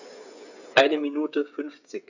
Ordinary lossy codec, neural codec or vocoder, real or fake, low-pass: none; codec, 16 kHz, 8 kbps, FreqCodec, smaller model; fake; 7.2 kHz